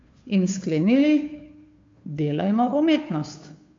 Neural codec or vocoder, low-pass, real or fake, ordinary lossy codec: codec, 16 kHz, 2 kbps, FunCodec, trained on Chinese and English, 25 frames a second; 7.2 kHz; fake; MP3, 48 kbps